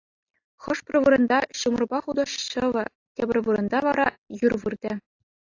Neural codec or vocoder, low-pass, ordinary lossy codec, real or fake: none; 7.2 kHz; AAC, 48 kbps; real